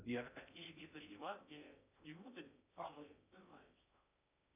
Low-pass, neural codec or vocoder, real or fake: 3.6 kHz; codec, 16 kHz in and 24 kHz out, 0.6 kbps, FocalCodec, streaming, 4096 codes; fake